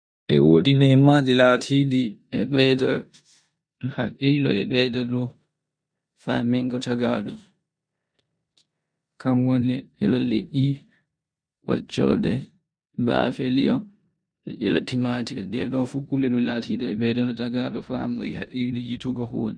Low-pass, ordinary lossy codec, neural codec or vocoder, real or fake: 9.9 kHz; none; codec, 16 kHz in and 24 kHz out, 0.9 kbps, LongCat-Audio-Codec, four codebook decoder; fake